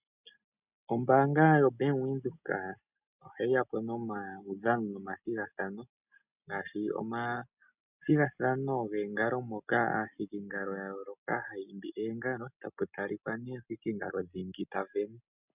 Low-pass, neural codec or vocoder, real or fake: 3.6 kHz; none; real